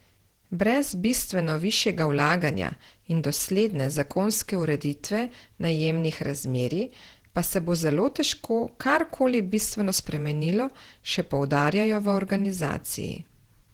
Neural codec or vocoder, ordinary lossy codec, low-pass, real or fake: vocoder, 48 kHz, 128 mel bands, Vocos; Opus, 16 kbps; 19.8 kHz; fake